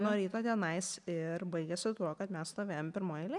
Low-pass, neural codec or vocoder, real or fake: 10.8 kHz; none; real